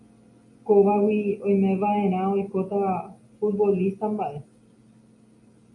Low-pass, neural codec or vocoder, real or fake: 10.8 kHz; none; real